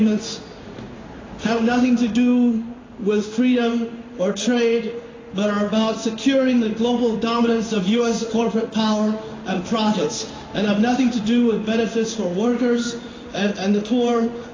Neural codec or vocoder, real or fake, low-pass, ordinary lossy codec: codec, 16 kHz in and 24 kHz out, 1 kbps, XY-Tokenizer; fake; 7.2 kHz; AAC, 32 kbps